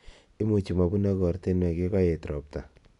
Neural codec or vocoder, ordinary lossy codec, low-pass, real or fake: none; none; 10.8 kHz; real